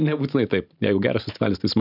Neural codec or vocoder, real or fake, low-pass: vocoder, 44.1 kHz, 128 mel bands every 512 samples, BigVGAN v2; fake; 5.4 kHz